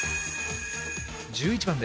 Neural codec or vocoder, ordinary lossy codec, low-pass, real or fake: none; none; none; real